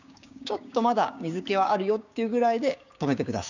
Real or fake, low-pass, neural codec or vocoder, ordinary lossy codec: fake; 7.2 kHz; codec, 16 kHz, 6 kbps, DAC; none